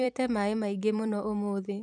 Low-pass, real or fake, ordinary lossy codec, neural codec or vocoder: 9.9 kHz; fake; none; vocoder, 44.1 kHz, 128 mel bands every 256 samples, BigVGAN v2